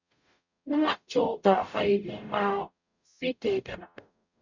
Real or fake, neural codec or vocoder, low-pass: fake; codec, 44.1 kHz, 0.9 kbps, DAC; 7.2 kHz